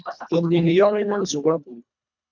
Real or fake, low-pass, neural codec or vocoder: fake; 7.2 kHz; codec, 24 kHz, 1.5 kbps, HILCodec